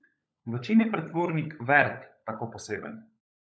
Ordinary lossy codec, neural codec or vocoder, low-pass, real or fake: none; codec, 16 kHz, 16 kbps, FunCodec, trained on LibriTTS, 50 frames a second; none; fake